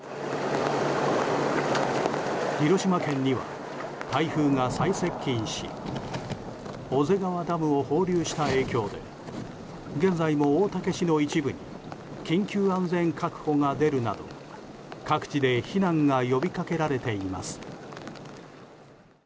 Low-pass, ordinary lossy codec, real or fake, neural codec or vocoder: none; none; real; none